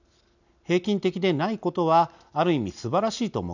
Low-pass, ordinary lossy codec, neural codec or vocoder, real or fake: 7.2 kHz; none; none; real